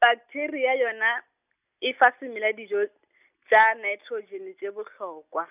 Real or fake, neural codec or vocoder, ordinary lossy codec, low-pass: real; none; none; 3.6 kHz